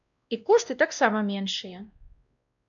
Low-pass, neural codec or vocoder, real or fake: 7.2 kHz; codec, 16 kHz, 1 kbps, X-Codec, WavLM features, trained on Multilingual LibriSpeech; fake